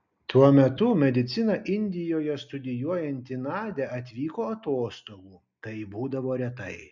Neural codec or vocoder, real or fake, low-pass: none; real; 7.2 kHz